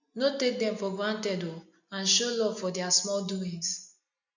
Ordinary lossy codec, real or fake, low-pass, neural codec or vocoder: none; real; 7.2 kHz; none